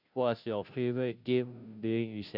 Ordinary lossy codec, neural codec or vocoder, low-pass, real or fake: none; codec, 16 kHz, 0.5 kbps, FunCodec, trained on Chinese and English, 25 frames a second; 5.4 kHz; fake